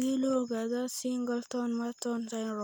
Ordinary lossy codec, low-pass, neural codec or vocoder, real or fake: none; none; none; real